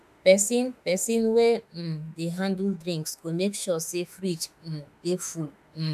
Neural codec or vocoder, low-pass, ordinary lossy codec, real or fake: autoencoder, 48 kHz, 32 numbers a frame, DAC-VAE, trained on Japanese speech; 14.4 kHz; none; fake